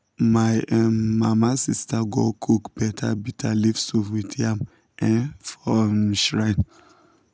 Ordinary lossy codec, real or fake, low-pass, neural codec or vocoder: none; real; none; none